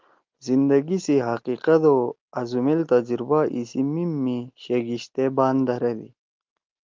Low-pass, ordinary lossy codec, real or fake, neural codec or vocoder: 7.2 kHz; Opus, 24 kbps; real; none